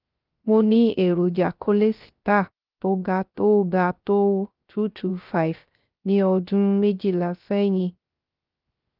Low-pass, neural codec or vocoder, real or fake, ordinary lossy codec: 5.4 kHz; codec, 16 kHz, 0.3 kbps, FocalCodec; fake; Opus, 24 kbps